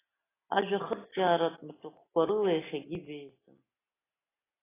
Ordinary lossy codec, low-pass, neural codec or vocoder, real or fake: AAC, 16 kbps; 3.6 kHz; none; real